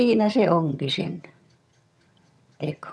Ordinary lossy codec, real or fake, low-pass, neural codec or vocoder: none; fake; none; vocoder, 22.05 kHz, 80 mel bands, HiFi-GAN